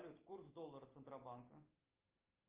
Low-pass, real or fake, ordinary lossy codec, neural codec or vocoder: 3.6 kHz; real; Opus, 24 kbps; none